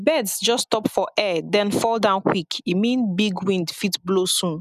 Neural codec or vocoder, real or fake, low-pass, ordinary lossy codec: none; real; 14.4 kHz; none